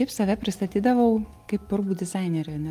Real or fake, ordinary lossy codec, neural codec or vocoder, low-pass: real; Opus, 32 kbps; none; 14.4 kHz